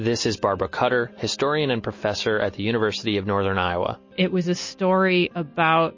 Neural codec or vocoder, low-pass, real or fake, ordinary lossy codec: none; 7.2 kHz; real; MP3, 32 kbps